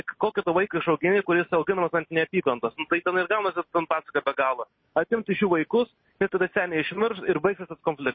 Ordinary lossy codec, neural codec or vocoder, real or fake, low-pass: MP3, 24 kbps; none; real; 7.2 kHz